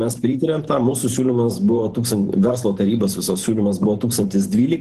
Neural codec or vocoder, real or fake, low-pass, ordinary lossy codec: vocoder, 44.1 kHz, 128 mel bands every 512 samples, BigVGAN v2; fake; 14.4 kHz; Opus, 16 kbps